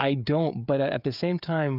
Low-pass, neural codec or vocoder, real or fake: 5.4 kHz; codec, 16 kHz, 16 kbps, FreqCodec, smaller model; fake